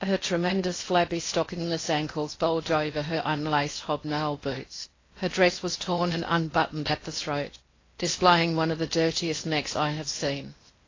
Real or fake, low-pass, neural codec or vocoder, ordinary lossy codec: fake; 7.2 kHz; codec, 16 kHz in and 24 kHz out, 0.8 kbps, FocalCodec, streaming, 65536 codes; AAC, 32 kbps